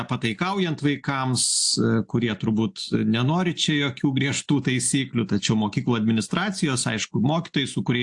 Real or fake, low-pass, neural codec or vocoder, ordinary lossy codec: real; 10.8 kHz; none; AAC, 64 kbps